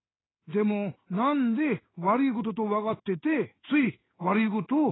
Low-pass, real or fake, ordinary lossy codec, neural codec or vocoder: 7.2 kHz; fake; AAC, 16 kbps; codec, 16 kHz in and 24 kHz out, 1 kbps, XY-Tokenizer